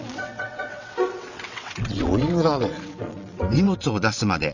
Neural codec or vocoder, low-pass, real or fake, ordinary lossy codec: vocoder, 22.05 kHz, 80 mel bands, WaveNeXt; 7.2 kHz; fake; none